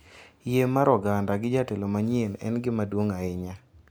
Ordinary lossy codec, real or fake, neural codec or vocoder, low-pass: none; real; none; none